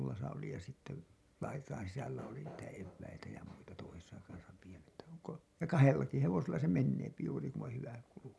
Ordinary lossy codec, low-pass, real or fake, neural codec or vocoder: none; none; real; none